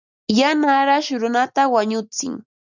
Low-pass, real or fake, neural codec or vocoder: 7.2 kHz; real; none